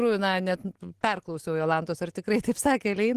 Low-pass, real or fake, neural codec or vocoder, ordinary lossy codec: 14.4 kHz; real; none; Opus, 16 kbps